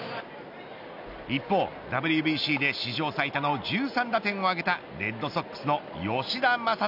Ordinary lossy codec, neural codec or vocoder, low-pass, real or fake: none; none; 5.4 kHz; real